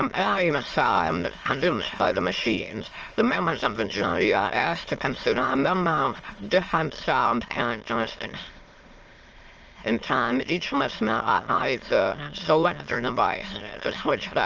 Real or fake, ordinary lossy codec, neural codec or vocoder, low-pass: fake; Opus, 24 kbps; autoencoder, 22.05 kHz, a latent of 192 numbers a frame, VITS, trained on many speakers; 7.2 kHz